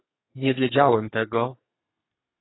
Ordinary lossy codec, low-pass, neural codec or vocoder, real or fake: AAC, 16 kbps; 7.2 kHz; codec, 32 kHz, 1.9 kbps, SNAC; fake